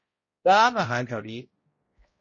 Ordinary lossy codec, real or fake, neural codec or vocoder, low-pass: MP3, 32 kbps; fake; codec, 16 kHz, 0.5 kbps, X-Codec, HuBERT features, trained on balanced general audio; 7.2 kHz